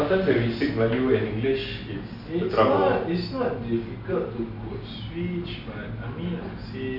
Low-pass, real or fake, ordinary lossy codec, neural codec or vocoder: 5.4 kHz; real; none; none